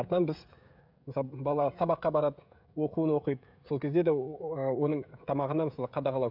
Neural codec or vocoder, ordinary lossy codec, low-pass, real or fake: codec, 16 kHz, 16 kbps, FreqCodec, smaller model; none; 5.4 kHz; fake